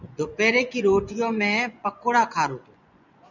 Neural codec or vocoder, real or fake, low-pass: vocoder, 24 kHz, 100 mel bands, Vocos; fake; 7.2 kHz